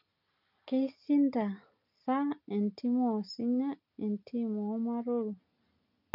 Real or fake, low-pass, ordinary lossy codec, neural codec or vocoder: real; 5.4 kHz; none; none